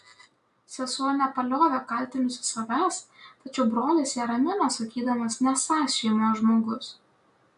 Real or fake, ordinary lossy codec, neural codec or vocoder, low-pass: real; AAC, 96 kbps; none; 10.8 kHz